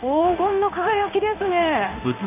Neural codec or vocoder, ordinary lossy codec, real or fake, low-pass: codec, 16 kHz in and 24 kHz out, 1 kbps, XY-Tokenizer; none; fake; 3.6 kHz